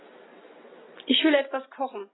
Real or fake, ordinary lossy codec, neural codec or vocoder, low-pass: real; AAC, 16 kbps; none; 7.2 kHz